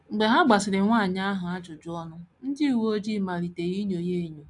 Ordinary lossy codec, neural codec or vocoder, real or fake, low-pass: none; none; real; 10.8 kHz